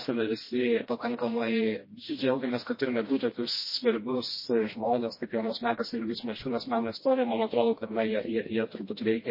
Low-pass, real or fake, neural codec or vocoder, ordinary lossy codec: 5.4 kHz; fake; codec, 16 kHz, 1 kbps, FreqCodec, smaller model; MP3, 24 kbps